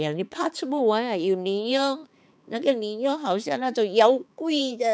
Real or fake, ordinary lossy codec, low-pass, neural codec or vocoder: fake; none; none; codec, 16 kHz, 4 kbps, X-Codec, HuBERT features, trained on balanced general audio